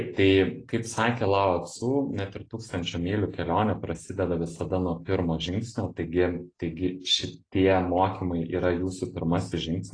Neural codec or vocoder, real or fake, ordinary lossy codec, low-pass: none; real; AAC, 32 kbps; 9.9 kHz